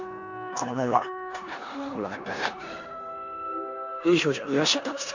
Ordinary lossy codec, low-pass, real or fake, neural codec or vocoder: none; 7.2 kHz; fake; codec, 16 kHz in and 24 kHz out, 0.9 kbps, LongCat-Audio-Codec, four codebook decoder